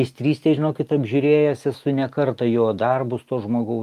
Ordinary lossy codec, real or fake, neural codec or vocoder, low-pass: Opus, 32 kbps; real; none; 14.4 kHz